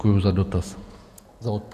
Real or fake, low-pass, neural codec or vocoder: fake; 14.4 kHz; vocoder, 48 kHz, 128 mel bands, Vocos